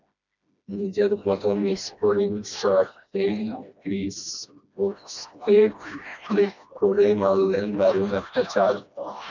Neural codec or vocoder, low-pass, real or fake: codec, 16 kHz, 1 kbps, FreqCodec, smaller model; 7.2 kHz; fake